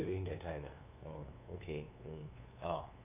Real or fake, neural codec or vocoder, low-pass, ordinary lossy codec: fake; codec, 16 kHz, 2 kbps, FunCodec, trained on LibriTTS, 25 frames a second; 3.6 kHz; AAC, 32 kbps